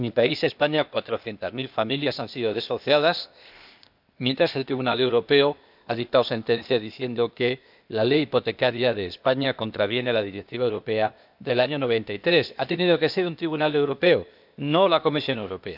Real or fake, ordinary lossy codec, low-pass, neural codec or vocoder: fake; none; 5.4 kHz; codec, 16 kHz, 0.8 kbps, ZipCodec